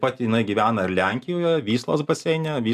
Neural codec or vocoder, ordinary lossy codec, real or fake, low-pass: none; AAC, 96 kbps; real; 14.4 kHz